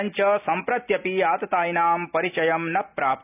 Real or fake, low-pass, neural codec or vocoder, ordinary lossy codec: real; 3.6 kHz; none; none